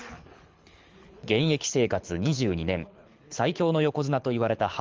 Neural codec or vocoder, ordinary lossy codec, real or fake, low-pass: codec, 24 kHz, 6 kbps, HILCodec; Opus, 32 kbps; fake; 7.2 kHz